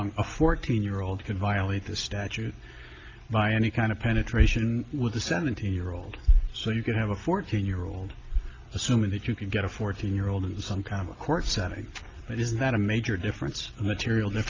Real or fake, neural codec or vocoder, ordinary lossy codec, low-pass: real; none; Opus, 32 kbps; 7.2 kHz